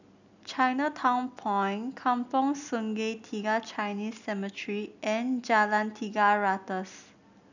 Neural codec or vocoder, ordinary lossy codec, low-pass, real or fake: none; none; 7.2 kHz; real